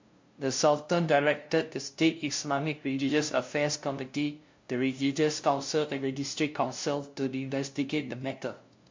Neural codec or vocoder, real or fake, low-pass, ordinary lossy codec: codec, 16 kHz, 0.5 kbps, FunCodec, trained on LibriTTS, 25 frames a second; fake; 7.2 kHz; MP3, 48 kbps